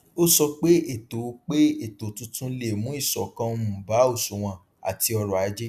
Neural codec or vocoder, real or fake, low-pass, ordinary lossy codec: none; real; 14.4 kHz; none